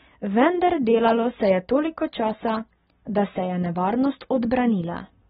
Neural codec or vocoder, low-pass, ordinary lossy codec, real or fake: none; 19.8 kHz; AAC, 16 kbps; real